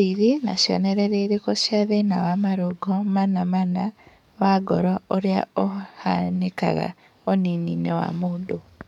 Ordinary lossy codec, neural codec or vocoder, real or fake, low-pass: none; codec, 44.1 kHz, 7.8 kbps, DAC; fake; 19.8 kHz